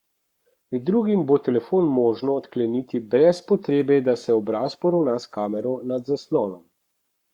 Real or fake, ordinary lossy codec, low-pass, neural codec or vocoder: fake; Opus, 64 kbps; 19.8 kHz; codec, 44.1 kHz, 7.8 kbps, Pupu-Codec